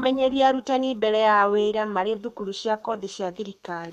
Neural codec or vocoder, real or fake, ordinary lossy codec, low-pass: codec, 32 kHz, 1.9 kbps, SNAC; fake; MP3, 96 kbps; 14.4 kHz